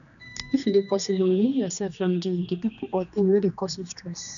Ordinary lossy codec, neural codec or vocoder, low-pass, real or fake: none; codec, 16 kHz, 2 kbps, X-Codec, HuBERT features, trained on general audio; 7.2 kHz; fake